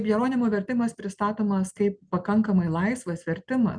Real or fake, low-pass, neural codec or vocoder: real; 9.9 kHz; none